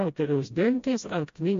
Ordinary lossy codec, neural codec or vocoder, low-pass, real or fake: MP3, 48 kbps; codec, 16 kHz, 0.5 kbps, FreqCodec, smaller model; 7.2 kHz; fake